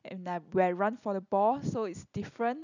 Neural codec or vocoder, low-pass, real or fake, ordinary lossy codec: none; 7.2 kHz; real; none